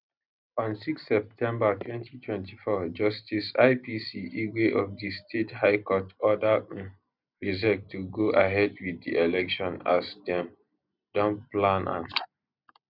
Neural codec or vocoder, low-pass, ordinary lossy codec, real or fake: none; 5.4 kHz; none; real